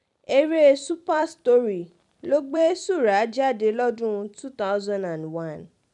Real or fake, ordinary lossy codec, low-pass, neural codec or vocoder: fake; MP3, 96 kbps; 10.8 kHz; vocoder, 44.1 kHz, 128 mel bands every 256 samples, BigVGAN v2